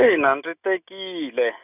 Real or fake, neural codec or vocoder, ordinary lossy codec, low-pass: real; none; none; 3.6 kHz